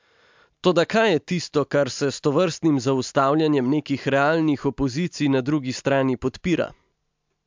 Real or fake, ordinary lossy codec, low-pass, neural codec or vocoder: real; MP3, 64 kbps; 7.2 kHz; none